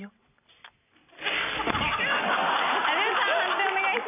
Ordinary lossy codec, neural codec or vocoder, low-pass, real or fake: none; none; 3.6 kHz; real